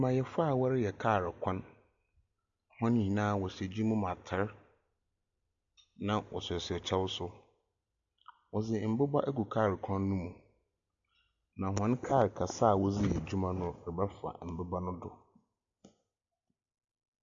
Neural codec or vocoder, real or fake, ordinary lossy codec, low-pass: none; real; AAC, 64 kbps; 7.2 kHz